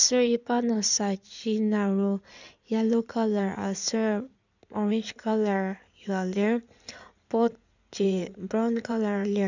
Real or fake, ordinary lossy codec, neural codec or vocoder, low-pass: fake; none; codec, 16 kHz in and 24 kHz out, 2.2 kbps, FireRedTTS-2 codec; 7.2 kHz